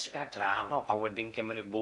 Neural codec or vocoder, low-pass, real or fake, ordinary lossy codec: codec, 16 kHz in and 24 kHz out, 0.6 kbps, FocalCodec, streaming, 4096 codes; 10.8 kHz; fake; AAC, 64 kbps